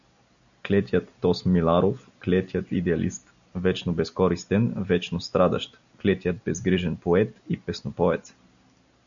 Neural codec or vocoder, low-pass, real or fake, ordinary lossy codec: none; 7.2 kHz; real; MP3, 96 kbps